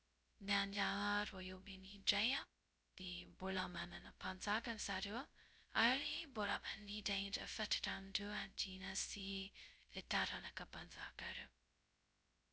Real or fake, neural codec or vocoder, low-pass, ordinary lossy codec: fake; codec, 16 kHz, 0.2 kbps, FocalCodec; none; none